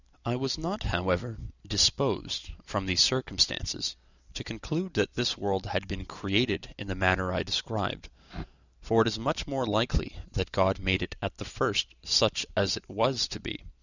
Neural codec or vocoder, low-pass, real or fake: none; 7.2 kHz; real